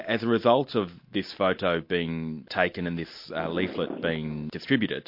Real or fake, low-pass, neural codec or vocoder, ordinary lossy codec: real; 5.4 kHz; none; MP3, 32 kbps